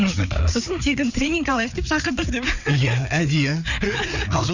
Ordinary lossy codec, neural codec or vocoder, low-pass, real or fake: none; codec, 16 kHz, 4 kbps, FreqCodec, larger model; 7.2 kHz; fake